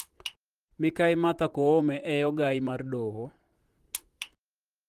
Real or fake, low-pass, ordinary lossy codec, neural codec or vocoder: fake; 14.4 kHz; Opus, 24 kbps; vocoder, 44.1 kHz, 128 mel bands, Pupu-Vocoder